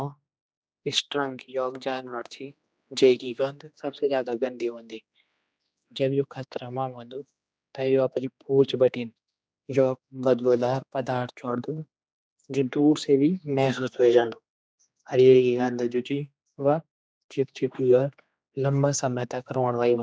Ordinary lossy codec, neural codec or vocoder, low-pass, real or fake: none; codec, 16 kHz, 2 kbps, X-Codec, HuBERT features, trained on general audio; none; fake